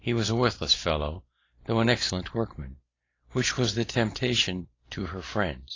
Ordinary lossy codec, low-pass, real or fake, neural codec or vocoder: AAC, 32 kbps; 7.2 kHz; real; none